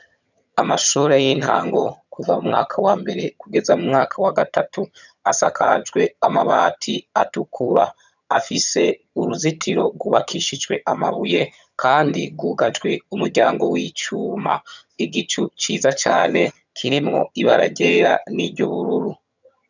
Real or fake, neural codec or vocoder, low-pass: fake; vocoder, 22.05 kHz, 80 mel bands, HiFi-GAN; 7.2 kHz